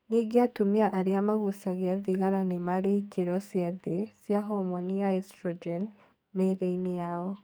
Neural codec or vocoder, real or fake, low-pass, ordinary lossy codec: codec, 44.1 kHz, 2.6 kbps, SNAC; fake; none; none